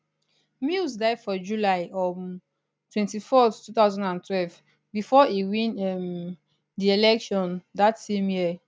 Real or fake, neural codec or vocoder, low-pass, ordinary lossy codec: real; none; none; none